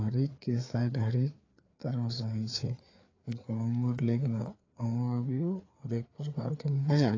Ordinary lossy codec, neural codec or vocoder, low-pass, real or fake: AAC, 32 kbps; codec, 16 kHz, 4 kbps, FreqCodec, larger model; 7.2 kHz; fake